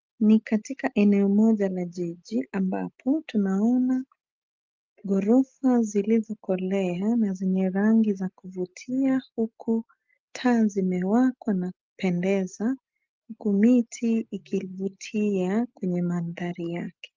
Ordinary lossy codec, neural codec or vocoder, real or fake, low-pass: Opus, 32 kbps; none; real; 7.2 kHz